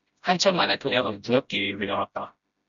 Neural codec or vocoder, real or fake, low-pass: codec, 16 kHz, 0.5 kbps, FreqCodec, smaller model; fake; 7.2 kHz